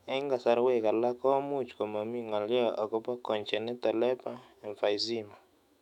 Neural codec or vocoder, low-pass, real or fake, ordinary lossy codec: autoencoder, 48 kHz, 128 numbers a frame, DAC-VAE, trained on Japanese speech; 19.8 kHz; fake; none